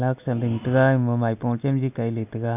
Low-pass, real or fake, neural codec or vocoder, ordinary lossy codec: 3.6 kHz; real; none; none